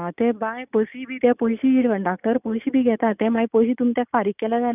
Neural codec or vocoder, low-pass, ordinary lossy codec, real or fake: none; 3.6 kHz; none; real